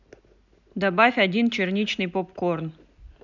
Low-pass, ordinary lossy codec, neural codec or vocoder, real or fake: 7.2 kHz; none; none; real